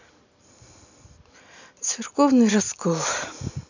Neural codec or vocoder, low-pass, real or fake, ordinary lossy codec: none; 7.2 kHz; real; none